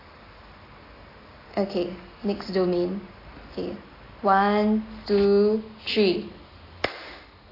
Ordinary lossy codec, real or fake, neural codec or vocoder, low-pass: AAC, 24 kbps; real; none; 5.4 kHz